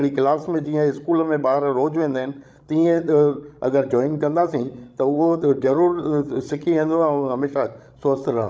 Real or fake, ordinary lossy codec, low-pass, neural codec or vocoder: fake; none; none; codec, 16 kHz, 16 kbps, FreqCodec, larger model